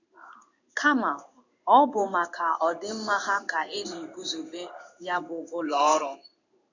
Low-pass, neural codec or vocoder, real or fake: 7.2 kHz; codec, 16 kHz in and 24 kHz out, 1 kbps, XY-Tokenizer; fake